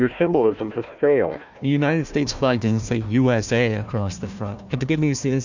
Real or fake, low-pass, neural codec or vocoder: fake; 7.2 kHz; codec, 16 kHz, 1 kbps, FunCodec, trained on Chinese and English, 50 frames a second